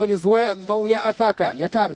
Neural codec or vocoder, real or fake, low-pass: codec, 24 kHz, 0.9 kbps, WavTokenizer, medium music audio release; fake; 10.8 kHz